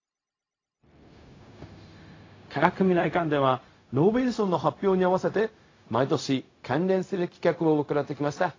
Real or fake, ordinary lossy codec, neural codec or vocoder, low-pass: fake; AAC, 32 kbps; codec, 16 kHz, 0.4 kbps, LongCat-Audio-Codec; 7.2 kHz